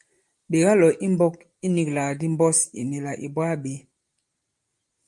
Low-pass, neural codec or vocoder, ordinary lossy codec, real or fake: 10.8 kHz; none; Opus, 32 kbps; real